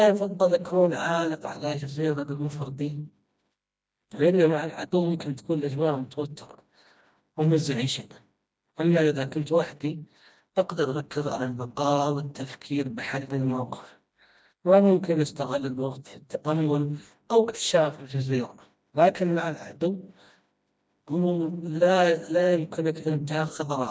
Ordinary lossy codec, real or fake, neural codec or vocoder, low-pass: none; fake; codec, 16 kHz, 1 kbps, FreqCodec, smaller model; none